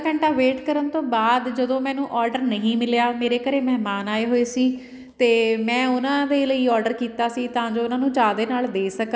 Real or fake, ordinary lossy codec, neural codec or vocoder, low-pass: real; none; none; none